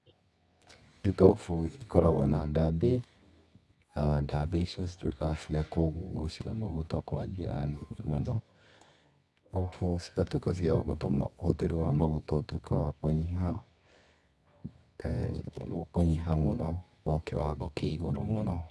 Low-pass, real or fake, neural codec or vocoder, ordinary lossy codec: none; fake; codec, 24 kHz, 0.9 kbps, WavTokenizer, medium music audio release; none